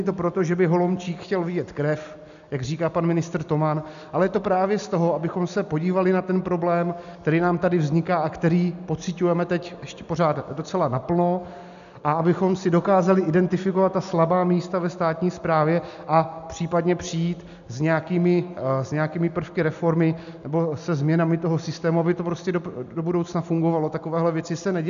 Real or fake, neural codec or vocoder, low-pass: real; none; 7.2 kHz